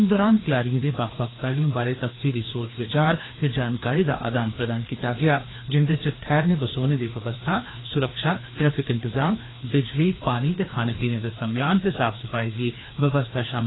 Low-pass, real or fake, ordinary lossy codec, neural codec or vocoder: 7.2 kHz; fake; AAC, 16 kbps; codec, 16 kHz, 2 kbps, FreqCodec, larger model